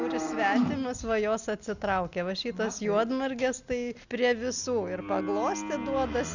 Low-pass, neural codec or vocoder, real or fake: 7.2 kHz; none; real